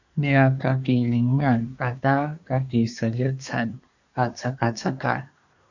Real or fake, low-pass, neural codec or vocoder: fake; 7.2 kHz; codec, 24 kHz, 1 kbps, SNAC